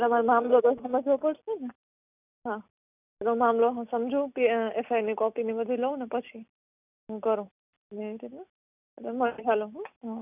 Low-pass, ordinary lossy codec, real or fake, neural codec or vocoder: 3.6 kHz; none; real; none